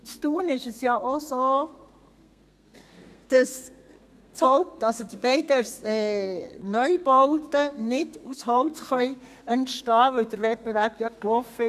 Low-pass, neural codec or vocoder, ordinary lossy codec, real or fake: 14.4 kHz; codec, 32 kHz, 1.9 kbps, SNAC; none; fake